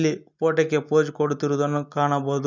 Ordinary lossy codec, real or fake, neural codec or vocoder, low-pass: none; real; none; 7.2 kHz